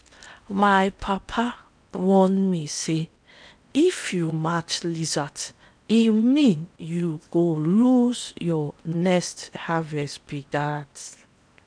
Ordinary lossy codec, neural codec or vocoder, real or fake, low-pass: none; codec, 16 kHz in and 24 kHz out, 0.8 kbps, FocalCodec, streaming, 65536 codes; fake; 9.9 kHz